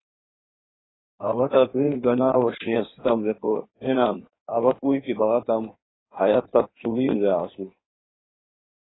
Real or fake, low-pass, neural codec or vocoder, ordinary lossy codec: fake; 7.2 kHz; codec, 16 kHz in and 24 kHz out, 1.1 kbps, FireRedTTS-2 codec; AAC, 16 kbps